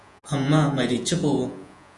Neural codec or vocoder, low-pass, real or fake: vocoder, 48 kHz, 128 mel bands, Vocos; 10.8 kHz; fake